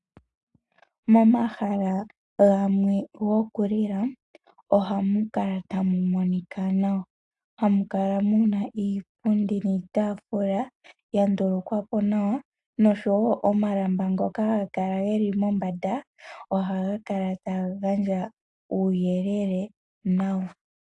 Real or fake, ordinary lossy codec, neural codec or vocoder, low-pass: real; AAC, 64 kbps; none; 10.8 kHz